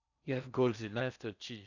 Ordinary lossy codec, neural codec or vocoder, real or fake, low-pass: none; codec, 16 kHz in and 24 kHz out, 0.6 kbps, FocalCodec, streaming, 4096 codes; fake; 7.2 kHz